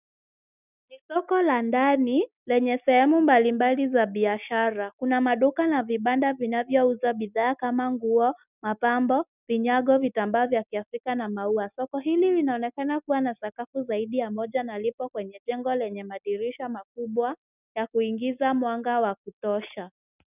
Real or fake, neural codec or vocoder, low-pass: real; none; 3.6 kHz